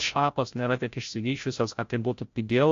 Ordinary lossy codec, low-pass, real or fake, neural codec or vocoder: AAC, 48 kbps; 7.2 kHz; fake; codec, 16 kHz, 0.5 kbps, FreqCodec, larger model